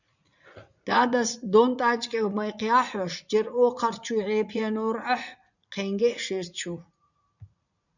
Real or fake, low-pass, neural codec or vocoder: fake; 7.2 kHz; vocoder, 44.1 kHz, 80 mel bands, Vocos